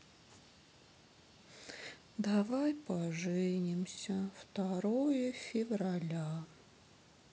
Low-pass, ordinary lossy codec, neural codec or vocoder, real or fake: none; none; none; real